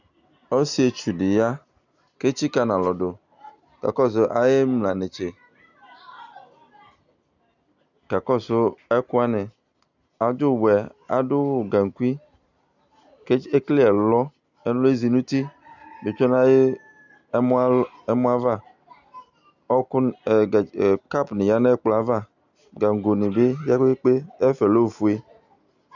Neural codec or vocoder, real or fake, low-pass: none; real; 7.2 kHz